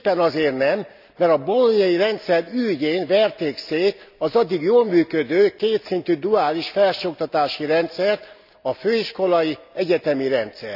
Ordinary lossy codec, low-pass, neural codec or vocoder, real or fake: none; 5.4 kHz; none; real